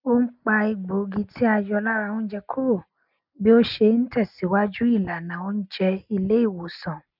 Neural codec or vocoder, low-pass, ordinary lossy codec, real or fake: none; 5.4 kHz; none; real